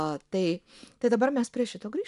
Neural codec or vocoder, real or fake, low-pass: none; real; 10.8 kHz